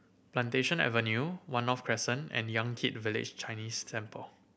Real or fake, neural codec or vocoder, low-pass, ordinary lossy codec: real; none; none; none